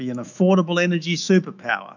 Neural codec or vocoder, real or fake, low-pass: autoencoder, 48 kHz, 128 numbers a frame, DAC-VAE, trained on Japanese speech; fake; 7.2 kHz